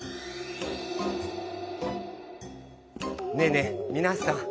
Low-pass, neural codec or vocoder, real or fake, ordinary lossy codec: none; none; real; none